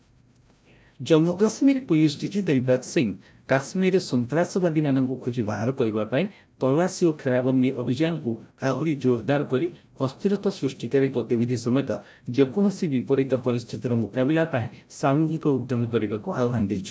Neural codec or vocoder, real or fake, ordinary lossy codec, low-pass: codec, 16 kHz, 0.5 kbps, FreqCodec, larger model; fake; none; none